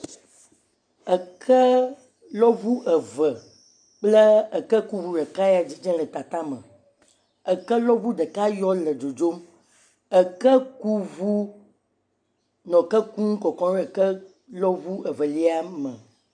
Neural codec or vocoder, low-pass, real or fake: none; 9.9 kHz; real